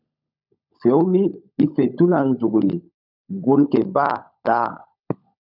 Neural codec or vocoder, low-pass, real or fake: codec, 16 kHz, 16 kbps, FunCodec, trained on LibriTTS, 50 frames a second; 5.4 kHz; fake